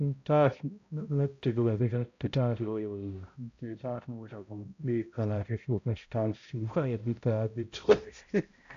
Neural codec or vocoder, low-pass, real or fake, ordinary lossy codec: codec, 16 kHz, 0.5 kbps, X-Codec, HuBERT features, trained on balanced general audio; 7.2 kHz; fake; AAC, 64 kbps